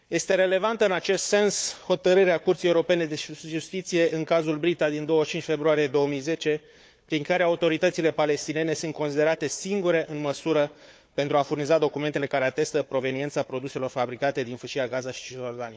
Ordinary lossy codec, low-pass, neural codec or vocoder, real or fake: none; none; codec, 16 kHz, 4 kbps, FunCodec, trained on Chinese and English, 50 frames a second; fake